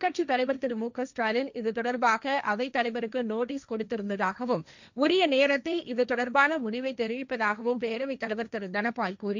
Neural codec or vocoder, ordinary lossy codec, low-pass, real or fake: codec, 16 kHz, 1.1 kbps, Voila-Tokenizer; none; 7.2 kHz; fake